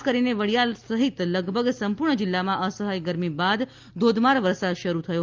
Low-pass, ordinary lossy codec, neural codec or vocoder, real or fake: 7.2 kHz; Opus, 32 kbps; none; real